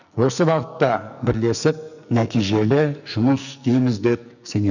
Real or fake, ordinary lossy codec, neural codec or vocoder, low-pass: fake; none; codec, 44.1 kHz, 2.6 kbps, SNAC; 7.2 kHz